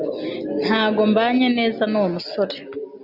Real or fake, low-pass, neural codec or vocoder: real; 5.4 kHz; none